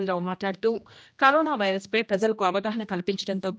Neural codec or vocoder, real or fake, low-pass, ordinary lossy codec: codec, 16 kHz, 1 kbps, X-Codec, HuBERT features, trained on general audio; fake; none; none